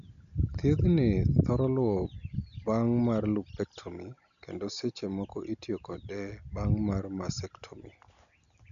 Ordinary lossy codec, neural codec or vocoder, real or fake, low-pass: none; none; real; 7.2 kHz